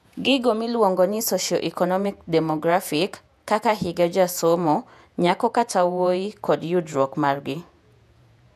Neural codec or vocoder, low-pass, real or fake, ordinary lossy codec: vocoder, 48 kHz, 128 mel bands, Vocos; 14.4 kHz; fake; none